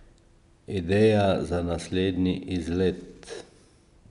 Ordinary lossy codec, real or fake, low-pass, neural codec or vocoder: none; real; 10.8 kHz; none